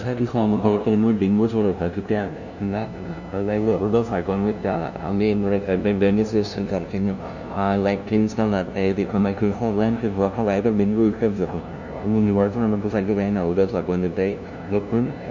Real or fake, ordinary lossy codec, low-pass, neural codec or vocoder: fake; none; 7.2 kHz; codec, 16 kHz, 0.5 kbps, FunCodec, trained on LibriTTS, 25 frames a second